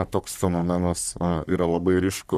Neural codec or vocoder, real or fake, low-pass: codec, 44.1 kHz, 3.4 kbps, Pupu-Codec; fake; 14.4 kHz